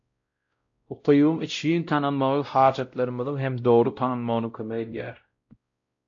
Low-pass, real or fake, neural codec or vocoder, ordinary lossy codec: 7.2 kHz; fake; codec, 16 kHz, 0.5 kbps, X-Codec, WavLM features, trained on Multilingual LibriSpeech; AAC, 48 kbps